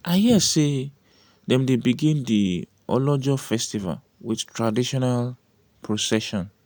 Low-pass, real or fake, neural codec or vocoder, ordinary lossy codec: none; real; none; none